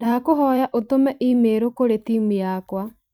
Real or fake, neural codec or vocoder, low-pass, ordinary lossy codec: fake; vocoder, 44.1 kHz, 128 mel bands every 512 samples, BigVGAN v2; 19.8 kHz; none